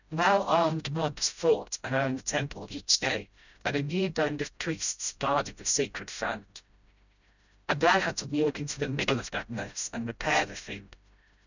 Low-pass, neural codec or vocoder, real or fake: 7.2 kHz; codec, 16 kHz, 0.5 kbps, FreqCodec, smaller model; fake